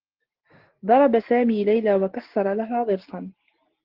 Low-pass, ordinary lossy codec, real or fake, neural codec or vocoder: 5.4 kHz; Opus, 16 kbps; real; none